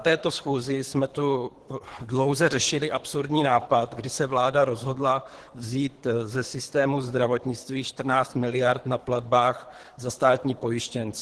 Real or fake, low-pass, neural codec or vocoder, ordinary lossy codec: fake; 10.8 kHz; codec, 24 kHz, 3 kbps, HILCodec; Opus, 16 kbps